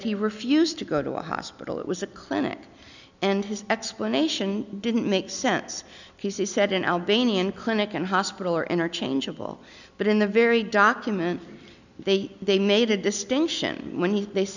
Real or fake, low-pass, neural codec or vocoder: real; 7.2 kHz; none